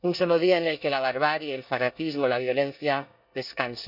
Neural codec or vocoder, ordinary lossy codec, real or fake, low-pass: codec, 24 kHz, 1 kbps, SNAC; none; fake; 5.4 kHz